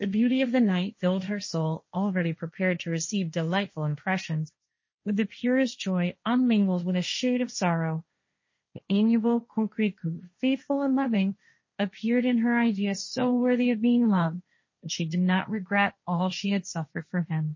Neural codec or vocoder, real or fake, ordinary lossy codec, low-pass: codec, 16 kHz, 1.1 kbps, Voila-Tokenizer; fake; MP3, 32 kbps; 7.2 kHz